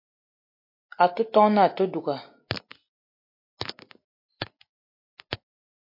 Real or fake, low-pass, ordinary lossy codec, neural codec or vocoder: real; 5.4 kHz; MP3, 32 kbps; none